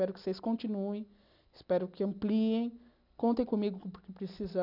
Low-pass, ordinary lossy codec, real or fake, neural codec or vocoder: 5.4 kHz; none; real; none